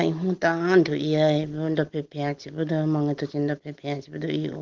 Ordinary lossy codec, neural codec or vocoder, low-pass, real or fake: Opus, 16 kbps; none; 7.2 kHz; real